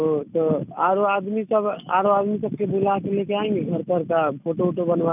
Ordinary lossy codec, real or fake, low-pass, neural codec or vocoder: none; real; 3.6 kHz; none